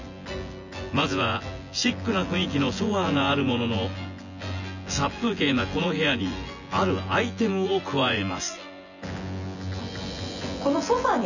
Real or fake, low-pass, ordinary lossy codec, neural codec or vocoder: fake; 7.2 kHz; none; vocoder, 24 kHz, 100 mel bands, Vocos